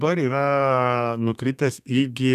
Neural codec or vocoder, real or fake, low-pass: codec, 32 kHz, 1.9 kbps, SNAC; fake; 14.4 kHz